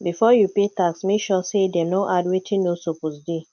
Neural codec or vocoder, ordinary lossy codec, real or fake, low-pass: none; none; real; 7.2 kHz